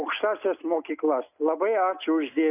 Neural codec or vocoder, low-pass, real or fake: none; 3.6 kHz; real